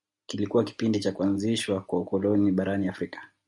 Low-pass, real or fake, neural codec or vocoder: 10.8 kHz; real; none